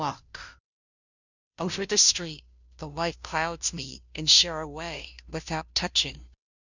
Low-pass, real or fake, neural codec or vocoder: 7.2 kHz; fake; codec, 16 kHz, 0.5 kbps, FunCodec, trained on Chinese and English, 25 frames a second